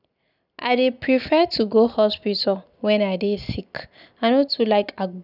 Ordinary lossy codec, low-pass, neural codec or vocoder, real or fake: none; 5.4 kHz; none; real